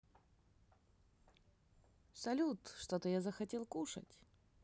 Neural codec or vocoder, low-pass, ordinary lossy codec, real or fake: none; none; none; real